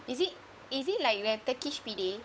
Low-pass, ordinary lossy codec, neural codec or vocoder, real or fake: none; none; codec, 16 kHz, 8 kbps, FunCodec, trained on Chinese and English, 25 frames a second; fake